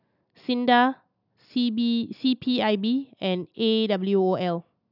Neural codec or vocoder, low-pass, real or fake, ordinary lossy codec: none; 5.4 kHz; real; none